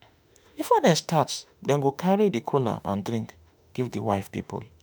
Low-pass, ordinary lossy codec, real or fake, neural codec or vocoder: none; none; fake; autoencoder, 48 kHz, 32 numbers a frame, DAC-VAE, trained on Japanese speech